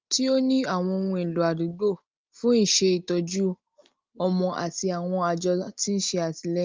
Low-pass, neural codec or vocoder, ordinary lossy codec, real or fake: 7.2 kHz; none; Opus, 24 kbps; real